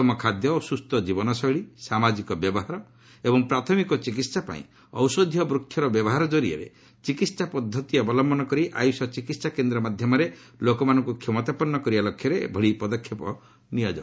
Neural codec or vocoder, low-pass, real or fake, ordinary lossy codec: none; none; real; none